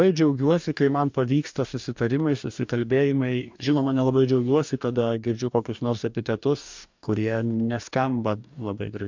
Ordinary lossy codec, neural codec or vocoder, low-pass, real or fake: MP3, 64 kbps; codec, 44.1 kHz, 2.6 kbps, DAC; 7.2 kHz; fake